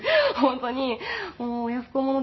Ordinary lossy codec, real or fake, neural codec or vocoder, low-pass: MP3, 24 kbps; real; none; 7.2 kHz